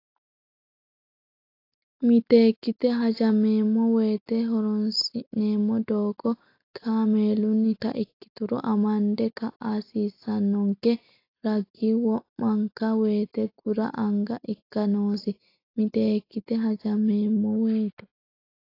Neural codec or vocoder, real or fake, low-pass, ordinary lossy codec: none; real; 5.4 kHz; AAC, 32 kbps